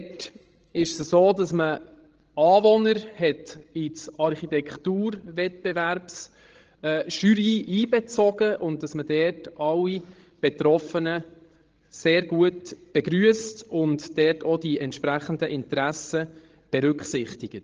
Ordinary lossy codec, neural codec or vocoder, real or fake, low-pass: Opus, 16 kbps; codec, 16 kHz, 16 kbps, FreqCodec, larger model; fake; 7.2 kHz